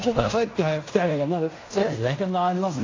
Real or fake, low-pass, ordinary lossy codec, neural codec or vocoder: fake; 7.2 kHz; AAC, 32 kbps; codec, 16 kHz in and 24 kHz out, 0.9 kbps, LongCat-Audio-Codec, fine tuned four codebook decoder